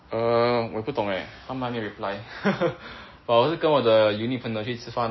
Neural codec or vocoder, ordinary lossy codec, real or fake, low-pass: none; MP3, 24 kbps; real; 7.2 kHz